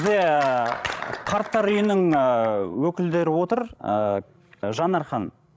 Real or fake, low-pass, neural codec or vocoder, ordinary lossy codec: fake; none; codec, 16 kHz, 16 kbps, FreqCodec, larger model; none